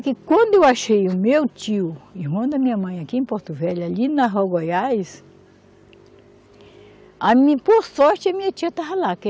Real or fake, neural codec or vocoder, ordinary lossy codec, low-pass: real; none; none; none